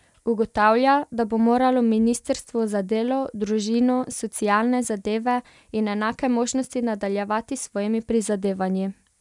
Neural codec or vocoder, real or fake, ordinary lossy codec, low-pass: none; real; none; 10.8 kHz